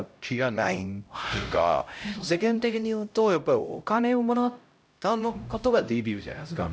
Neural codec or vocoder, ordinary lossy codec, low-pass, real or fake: codec, 16 kHz, 0.5 kbps, X-Codec, HuBERT features, trained on LibriSpeech; none; none; fake